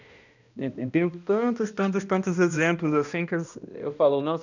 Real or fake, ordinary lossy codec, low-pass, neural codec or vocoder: fake; none; 7.2 kHz; codec, 16 kHz, 1 kbps, X-Codec, HuBERT features, trained on balanced general audio